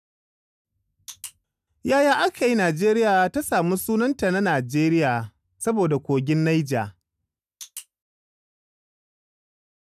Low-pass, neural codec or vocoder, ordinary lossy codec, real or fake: 14.4 kHz; none; none; real